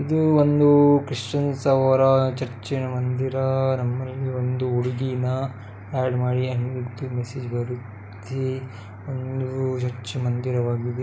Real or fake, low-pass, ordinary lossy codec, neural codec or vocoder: real; none; none; none